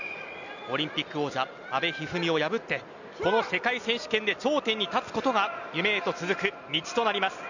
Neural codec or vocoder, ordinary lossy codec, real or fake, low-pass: none; none; real; 7.2 kHz